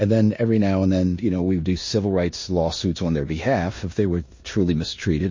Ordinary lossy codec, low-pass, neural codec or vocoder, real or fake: MP3, 32 kbps; 7.2 kHz; codec, 16 kHz in and 24 kHz out, 0.9 kbps, LongCat-Audio-Codec, four codebook decoder; fake